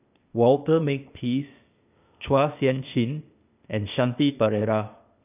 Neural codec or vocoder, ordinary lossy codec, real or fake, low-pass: codec, 16 kHz, 0.8 kbps, ZipCodec; none; fake; 3.6 kHz